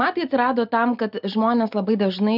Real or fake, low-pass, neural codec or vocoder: real; 5.4 kHz; none